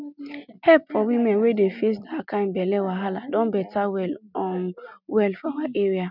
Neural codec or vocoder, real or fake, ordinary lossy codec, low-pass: none; real; none; 5.4 kHz